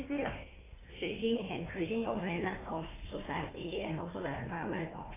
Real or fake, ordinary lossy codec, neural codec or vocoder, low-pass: fake; AAC, 16 kbps; codec, 16 kHz, 1 kbps, FunCodec, trained on Chinese and English, 50 frames a second; 3.6 kHz